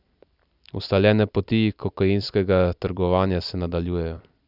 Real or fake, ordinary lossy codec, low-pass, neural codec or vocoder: fake; none; 5.4 kHz; vocoder, 44.1 kHz, 128 mel bands every 256 samples, BigVGAN v2